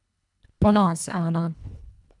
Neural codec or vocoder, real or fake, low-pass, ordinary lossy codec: codec, 24 kHz, 1.5 kbps, HILCodec; fake; 10.8 kHz; none